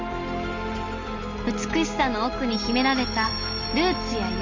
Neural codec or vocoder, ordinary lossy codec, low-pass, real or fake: none; Opus, 32 kbps; 7.2 kHz; real